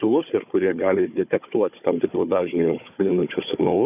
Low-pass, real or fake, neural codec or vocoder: 3.6 kHz; fake; codec, 16 kHz, 4 kbps, FunCodec, trained on Chinese and English, 50 frames a second